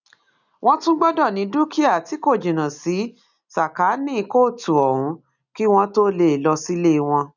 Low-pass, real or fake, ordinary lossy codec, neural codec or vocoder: 7.2 kHz; real; none; none